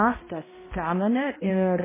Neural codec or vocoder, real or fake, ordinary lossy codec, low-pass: codec, 16 kHz, 0.5 kbps, X-Codec, HuBERT features, trained on balanced general audio; fake; MP3, 16 kbps; 3.6 kHz